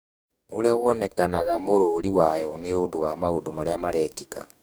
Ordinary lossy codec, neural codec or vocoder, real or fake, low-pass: none; codec, 44.1 kHz, 2.6 kbps, DAC; fake; none